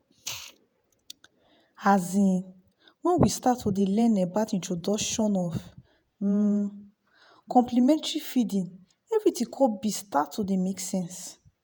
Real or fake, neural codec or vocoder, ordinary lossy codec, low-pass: fake; vocoder, 48 kHz, 128 mel bands, Vocos; none; none